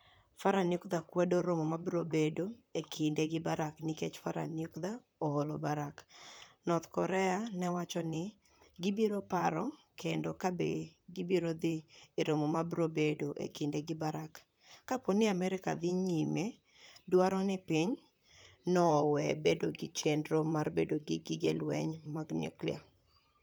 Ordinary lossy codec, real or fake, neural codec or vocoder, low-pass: none; fake; vocoder, 44.1 kHz, 128 mel bands, Pupu-Vocoder; none